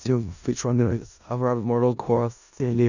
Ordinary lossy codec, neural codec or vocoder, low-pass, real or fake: none; codec, 16 kHz in and 24 kHz out, 0.4 kbps, LongCat-Audio-Codec, four codebook decoder; 7.2 kHz; fake